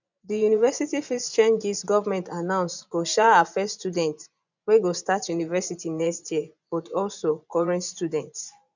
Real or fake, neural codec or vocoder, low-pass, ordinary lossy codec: fake; vocoder, 24 kHz, 100 mel bands, Vocos; 7.2 kHz; none